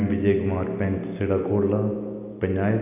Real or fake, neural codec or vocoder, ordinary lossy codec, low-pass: real; none; none; 3.6 kHz